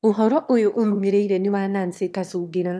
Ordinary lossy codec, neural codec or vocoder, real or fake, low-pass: none; autoencoder, 22.05 kHz, a latent of 192 numbers a frame, VITS, trained on one speaker; fake; none